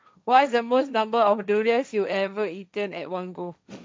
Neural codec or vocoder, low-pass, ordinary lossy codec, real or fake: codec, 16 kHz, 1.1 kbps, Voila-Tokenizer; none; none; fake